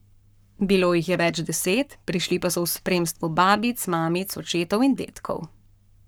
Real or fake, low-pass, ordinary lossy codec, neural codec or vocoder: fake; none; none; codec, 44.1 kHz, 7.8 kbps, Pupu-Codec